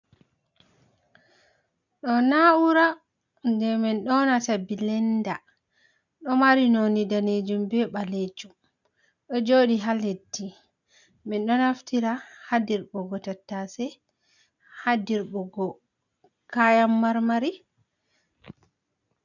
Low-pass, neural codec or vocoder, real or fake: 7.2 kHz; none; real